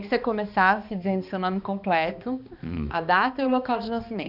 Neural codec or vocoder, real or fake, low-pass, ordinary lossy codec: codec, 16 kHz, 2 kbps, X-Codec, HuBERT features, trained on balanced general audio; fake; 5.4 kHz; none